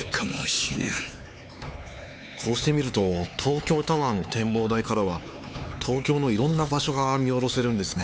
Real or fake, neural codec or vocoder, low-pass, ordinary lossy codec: fake; codec, 16 kHz, 4 kbps, X-Codec, HuBERT features, trained on LibriSpeech; none; none